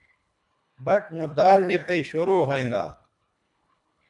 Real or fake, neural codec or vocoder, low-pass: fake; codec, 24 kHz, 1.5 kbps, HILCodec; 10.8 kHz